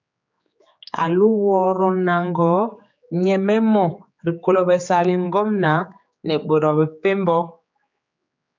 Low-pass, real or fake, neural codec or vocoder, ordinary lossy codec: 7.2 kHz; fake; codec, 16 kHz, 4 kbps, X-Codec, HuBERT features, trained on general audio; MP3, 64 kbps